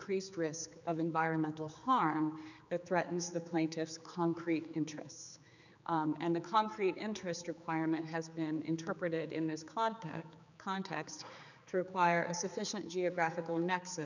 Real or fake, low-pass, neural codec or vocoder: fake; 7.2 kHz; codec, 16 kHz, 4 kbps, X-Codec, HuBERT features, trained on general audio